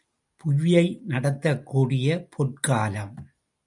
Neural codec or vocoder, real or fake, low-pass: none; real; 10.8 kHz